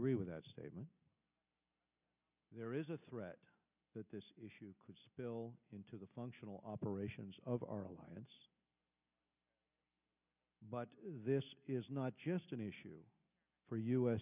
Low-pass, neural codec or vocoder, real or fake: 3.6 kHz; none; real